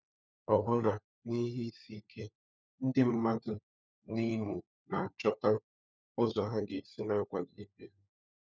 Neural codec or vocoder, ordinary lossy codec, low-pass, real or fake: codec, 16 kHz, 4 kbps, FunCodec, trained on LibriTTS, 50 frames a second; none; none; fake